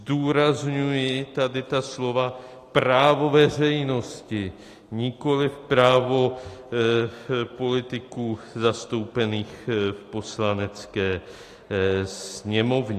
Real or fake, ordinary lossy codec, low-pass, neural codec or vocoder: real; AAC, 48 kbps; 14.4 kHz; none